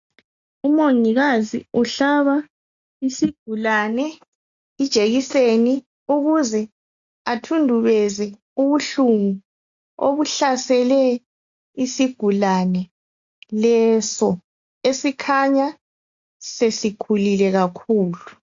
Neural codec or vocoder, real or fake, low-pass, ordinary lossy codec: none; real; 7.2 kHz; AAC, 64 kbps